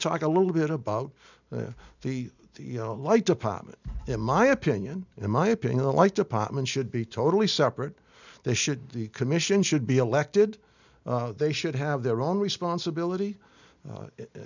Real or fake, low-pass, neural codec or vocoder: real; 7.2 kHz; none